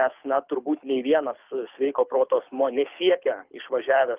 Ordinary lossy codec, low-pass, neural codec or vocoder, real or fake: Opus, 24 kbps; 3.6 kHz; codec, 24 kHz, 6 kbps, HILCodec; fake